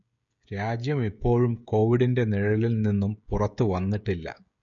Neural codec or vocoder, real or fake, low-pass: codec, 16 kHz, 16 kbps, FreqCodec, smaller model; fake; 7.2 kHz